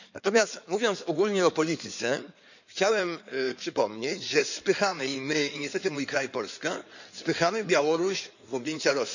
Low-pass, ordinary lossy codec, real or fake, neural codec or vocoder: 7.2 kHz; none; fake; codec, 16 kHz in and 24 kHz out, 2.2 kbps, FireRedTTS-2 codec